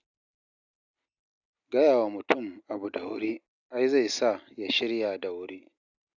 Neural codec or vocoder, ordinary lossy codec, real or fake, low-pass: none; none; real; 7.2 kHz